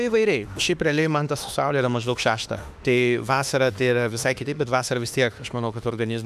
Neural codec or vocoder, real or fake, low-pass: autoencoder, 48 kHz, 32 numbers a frame, DAC-VAE, trained on Japanese speech; fake; 14.4 kHz